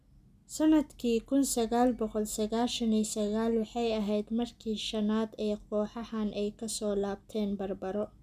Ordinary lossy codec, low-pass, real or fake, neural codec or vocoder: none; 14.4 kHz; real; none